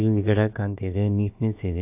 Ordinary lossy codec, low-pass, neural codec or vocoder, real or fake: AAC, 32 kbps; 3.6 kHz; codec, 16 kHz, about 1 kbps, DyCAST, with the encoder's durations; fake